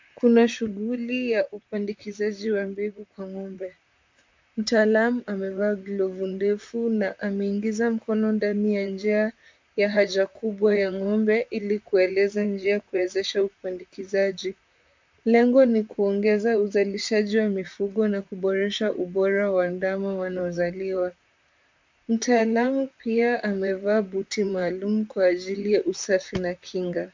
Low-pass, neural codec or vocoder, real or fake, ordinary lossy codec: 7.2 kHz; vocoder, 44.1 kHz, 128 mel bands, Pupu-Vocoder; fake; MP3, 64 kbps